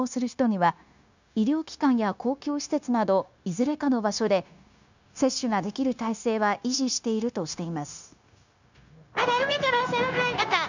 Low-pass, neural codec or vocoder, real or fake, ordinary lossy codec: 7.2 kHz; codec, 16 kHz, 0.9 kbps, LongCat-Audio-Codec; fake; none